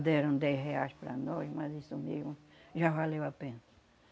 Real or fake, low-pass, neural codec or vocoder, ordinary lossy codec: real; none; none; none